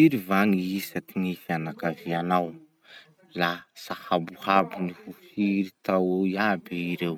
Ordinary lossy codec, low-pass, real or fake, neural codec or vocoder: none; 19.8 kHz; real; none